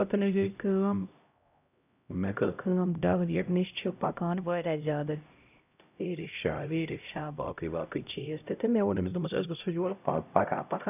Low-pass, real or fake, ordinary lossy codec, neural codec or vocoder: 3.6 kHz; fake; none; codec, 16 kHz, 0.5 kbps, X-Codec, HuBERT features, trained on LibriSpeech